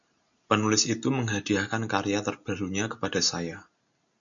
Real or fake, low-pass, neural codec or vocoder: real; 7.2 kHz; none